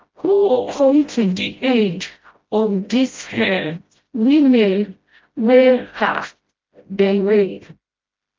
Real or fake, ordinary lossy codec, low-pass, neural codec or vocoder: fake; Opus, 24 kbps; 7.2 kHz; codec, 16 kHz, 0.5 kbps, FreqCodec, smaller model